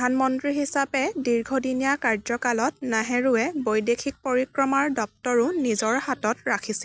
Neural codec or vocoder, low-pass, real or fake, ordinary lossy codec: none; none; real; none